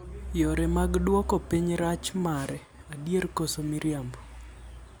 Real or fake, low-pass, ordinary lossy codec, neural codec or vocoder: real; none; none; none